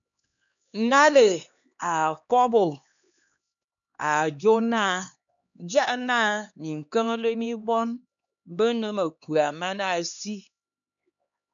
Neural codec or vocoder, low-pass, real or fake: codec, 16 kHz, 2 kbps, X-Codec, HuBERT features, trained on LibriSpeech; 7.2 kHz; fake